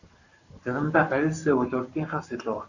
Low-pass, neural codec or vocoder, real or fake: 7.2 kHz; codec, 44.1 kHz, 7.8 kbps, Pupu-Codec; fake